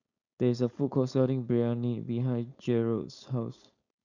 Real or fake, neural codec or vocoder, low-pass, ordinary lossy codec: fake; codec, 16 kHz, 4.8 kbps, FACodec; 7.2 kHz; none